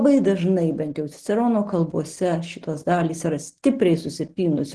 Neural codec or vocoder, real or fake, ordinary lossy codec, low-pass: none; real; Opus, 16 kbps; 10.8 kHz